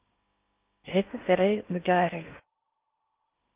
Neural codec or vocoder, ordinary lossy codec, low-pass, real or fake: codec, 16 kHz in and 24 kHz out, 0.6 kbps, FocalCodec, streaming, 2048 codes; Opus, 32 kbps; 3.6 kHz; fake